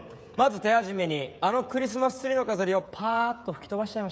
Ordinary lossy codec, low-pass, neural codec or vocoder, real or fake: none; none; codec, 16 kHz, 16 kbps, FreqCodec, smaller model; fake